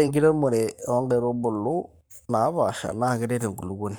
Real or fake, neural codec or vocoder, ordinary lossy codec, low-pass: fake; codec, 44.1 kHz, 7.8 kbps, Pupu-Codec; none; none